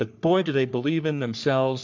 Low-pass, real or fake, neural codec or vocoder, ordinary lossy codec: 7.2 kHz; fake; codec, 44.1 kHz, 3.4 kbps, Pupu-Codec; MP3, 64 kbps